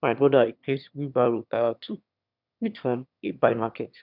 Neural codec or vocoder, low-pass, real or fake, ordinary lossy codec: autoencoder, 22.05 kHz, a latent of 192 numbers a frame, VITS, trained on one speaker; 5.4 kHz; fake; none